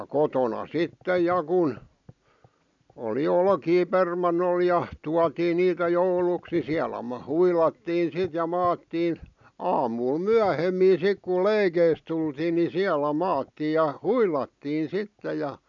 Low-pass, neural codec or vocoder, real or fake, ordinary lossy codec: 7.2 kHz; none; real; none